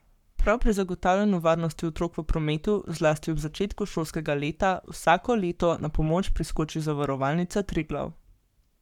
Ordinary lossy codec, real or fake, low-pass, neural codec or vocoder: none; fake; 19.8 kHz; codec, 44.1 kHz, 7.8 kbps, Pupu-Codec